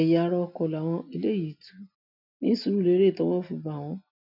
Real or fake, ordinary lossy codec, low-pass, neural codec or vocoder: real; none; 5.4 kHz; none